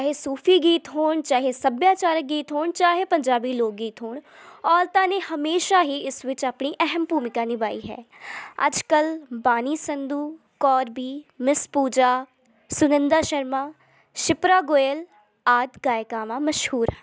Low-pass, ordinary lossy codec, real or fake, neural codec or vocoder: none; none; real; none